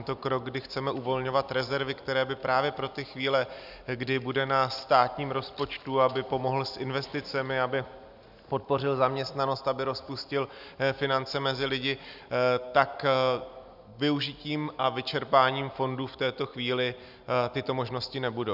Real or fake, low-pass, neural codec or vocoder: real; 5.4 kHz; none